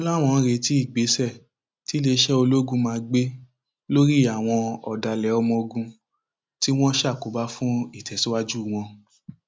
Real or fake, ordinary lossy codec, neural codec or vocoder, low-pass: real; none; none; none